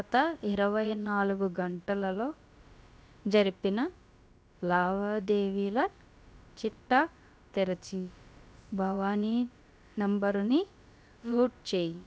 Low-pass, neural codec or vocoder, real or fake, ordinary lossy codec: none; codec, 16 kHz, about 1 kbps, DyCAST, with the encoder's durations; fake; none